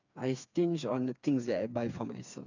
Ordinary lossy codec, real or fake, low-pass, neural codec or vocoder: none; fake; 7.2 kHz; codec, 16 kHz, 4 kbps, FreqCodec, smaller model